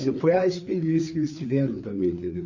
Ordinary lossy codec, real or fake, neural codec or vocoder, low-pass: none; fake; codec, 16 kHz, 4 kbps, FreqCodec, larger model; 7.2 kHz